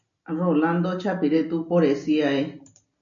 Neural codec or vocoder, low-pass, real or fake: none; 7.2 kHz; real